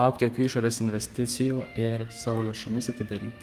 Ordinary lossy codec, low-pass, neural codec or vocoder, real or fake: Opus, 32 kbps; 14.4 kHz; codec, 32 kHz, 1.9 kbps, SNAC; fake